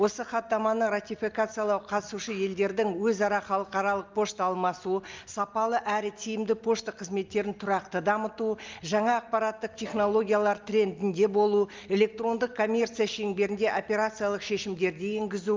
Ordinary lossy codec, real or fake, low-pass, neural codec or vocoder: Opus, 24 kbps; real; 7.2 kHz; none